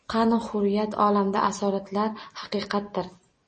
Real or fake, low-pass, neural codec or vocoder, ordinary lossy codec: real; 10.8 kHz; none; MP3, 32 kbps